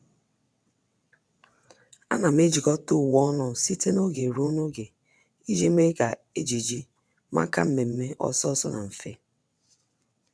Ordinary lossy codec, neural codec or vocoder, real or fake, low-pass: none; vocoder, 22.05 kHz, 80 mel bands, WaveNeXt; fake; none